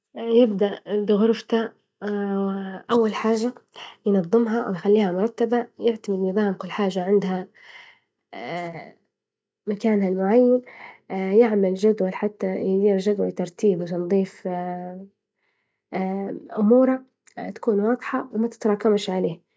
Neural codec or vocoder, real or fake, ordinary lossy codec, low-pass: none; real; none; none